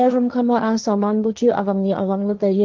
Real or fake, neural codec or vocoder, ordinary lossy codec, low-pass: fake; codec, 16 kHz, 1.1 kbps, Voila-Tokenizer; Opus, 32 kbps; 7.2 kHz